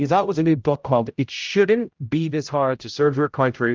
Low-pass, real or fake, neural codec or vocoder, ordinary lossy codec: 7.2 kHz; fake; codec, 16 kHz, 0.5 kbps, X-Codec, HuBERT features, trained on general audio; Opus, 32 kbps